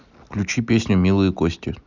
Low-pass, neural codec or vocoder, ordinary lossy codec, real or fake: 7.2 kHz; none; none; real